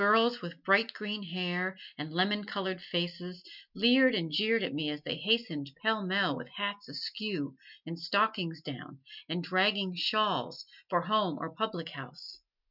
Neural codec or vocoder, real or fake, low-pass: none; real; 5.4 kHz